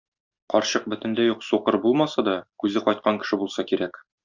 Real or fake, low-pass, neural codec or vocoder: real; 7.2 kHz; none